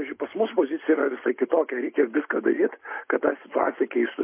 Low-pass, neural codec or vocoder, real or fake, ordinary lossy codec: 3.6 kHz; none; real; MP3, 24 kbps